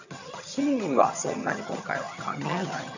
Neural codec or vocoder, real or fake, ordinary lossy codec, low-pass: vocoder, 22.05 kHz, 80 mel bands, HiFi-GAN; fake; none; 7.2 kHz